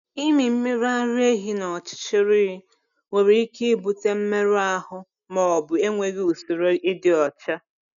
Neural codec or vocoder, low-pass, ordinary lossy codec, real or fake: none; 7.2 kHz; none; real